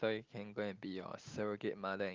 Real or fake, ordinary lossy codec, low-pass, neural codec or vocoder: real; Opus, 24 kbps; 7.2 kHz; none